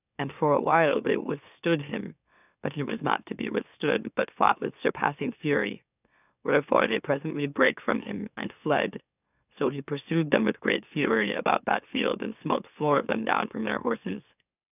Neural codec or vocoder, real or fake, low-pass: autoencoder, 44.1 kHz, a latent of 192 numbers a frame, MeloTTS; fake; 3.6 kHz